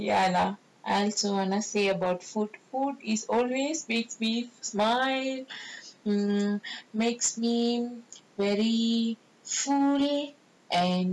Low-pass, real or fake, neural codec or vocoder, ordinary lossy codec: none; real; none; none